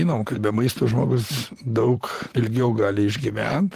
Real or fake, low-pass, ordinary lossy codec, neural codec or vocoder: fake; 14.4 kHz; Opus, 16 kbps; vocoder, 44.1 kHz, 128 mel bands, Pupu-Vocoder